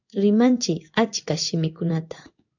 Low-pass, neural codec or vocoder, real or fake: 7.2 kHz; codec, 16 kHz in and 24 kHz out, 1 kbps, XY-Tokenizer; fake